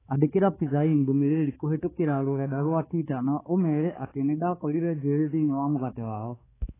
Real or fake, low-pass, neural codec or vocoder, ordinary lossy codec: fake; 3.6 kHz; codec, 16 kHz, 4 kbps, X-Codec, HuBERT features, trained on balanced general audio; AAC, 16 kbps